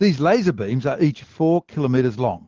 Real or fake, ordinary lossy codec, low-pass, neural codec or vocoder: real; Opus, 16 kbps; 7.2 kHz; none